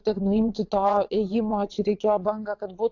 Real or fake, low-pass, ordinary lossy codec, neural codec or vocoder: fake; 7.2 kHz; AAC, 48 kbps; vocoder, 22.05 kHz, 80 mel bands, WaveNeXt